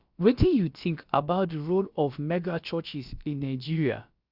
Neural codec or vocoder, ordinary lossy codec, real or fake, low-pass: codec, 16 kHz, about 1 kbps, DyCAST, with the encoder's durations; Opus, 64 kbps; fake; 5.4 kHz